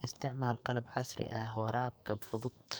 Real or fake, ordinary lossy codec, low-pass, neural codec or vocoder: fake; none; none; codec, 44.1 kHz, 2.6 kbps, SNAC